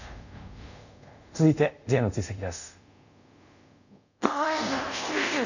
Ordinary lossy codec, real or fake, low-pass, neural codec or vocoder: none; fake; 7.2 kHz; codec, 24 kHz, 0.5 kbps, DualCodec